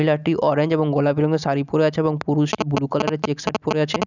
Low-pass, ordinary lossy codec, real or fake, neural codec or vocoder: 7.2 kHz; none; real; none